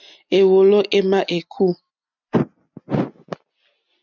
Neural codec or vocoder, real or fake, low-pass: none; real; 7.2 kHz